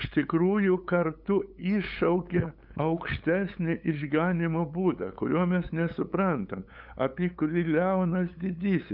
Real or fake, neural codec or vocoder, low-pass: fake; codec, 16 kHz, 8 kbps, FunCodec, trained on LibriTTS, 25 frames a second; 5.4 kHz